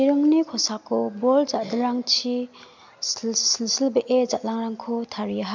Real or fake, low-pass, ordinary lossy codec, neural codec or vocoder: real; 7.2 kHz; MP3, 64 kbps; none